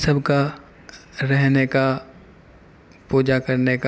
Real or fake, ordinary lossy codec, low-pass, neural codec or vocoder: real; none; none; none